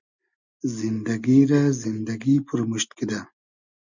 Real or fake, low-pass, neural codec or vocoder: real; 7.2 kHz; none